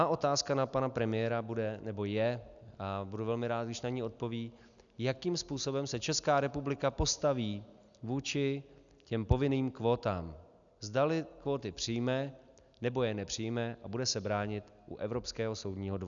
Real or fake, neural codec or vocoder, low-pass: real; none; 7.2 kHz